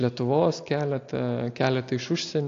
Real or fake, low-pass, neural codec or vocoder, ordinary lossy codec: real; 7.2 kHz; none; AAC, 48 kbps